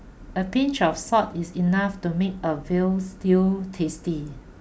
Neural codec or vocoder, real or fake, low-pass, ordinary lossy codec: none; real; none; none